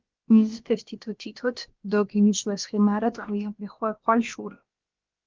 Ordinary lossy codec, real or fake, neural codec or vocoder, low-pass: Opus, 32 kbps; fake; codec, 16 kHz, about 1 kbps, DyCAST, with the encoder's durations; 7.2 kHz